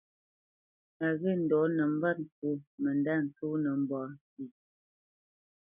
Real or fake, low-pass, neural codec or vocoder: real; 3.6 kHz; none